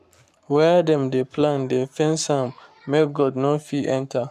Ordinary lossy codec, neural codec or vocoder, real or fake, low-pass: none; codec, 44.1 kHz, 7.8 kbps, Pupu-Codec; fake; 14.4 kHz